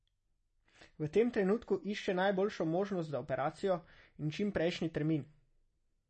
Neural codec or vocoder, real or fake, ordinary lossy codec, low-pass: none; real; MP3, 32 kbps; 10.8 kHz